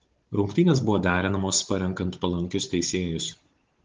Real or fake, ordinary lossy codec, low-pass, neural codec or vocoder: fake; Opus, 16 kbps; 7.2 kHz; codec, 16 kHz, 16 kbps, FunCodec, trained on Chinese and English, 50 frames a second